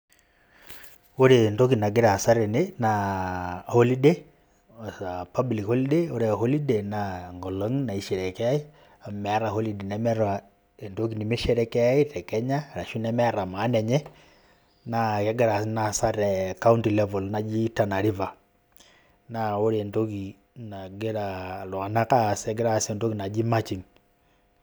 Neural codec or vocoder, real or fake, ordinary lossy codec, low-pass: none; real; none; none